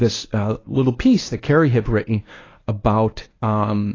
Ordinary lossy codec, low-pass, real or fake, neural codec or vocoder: AAC, 32 kbps; 7.2 kHz; fake; codec, 24 kHz, 0.9 kbps, WavTokenizer, medium speech release version 1